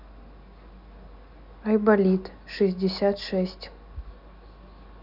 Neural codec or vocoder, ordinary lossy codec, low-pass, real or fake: none; none; 5.4 kHz; real